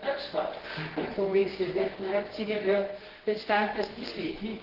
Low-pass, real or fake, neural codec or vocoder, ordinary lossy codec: 5.4 kHz; fake; codec, 24 kHz, 0.9 kbps, WavTokenizer, medium music audio release; Opus, 16 kbps